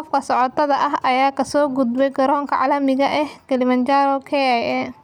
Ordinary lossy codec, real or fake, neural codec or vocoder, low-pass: none; real; none; 19.8 kHz